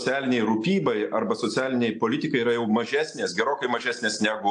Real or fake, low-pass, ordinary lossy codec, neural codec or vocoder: real; 10.8 kHz; AAC, 64 kbps; none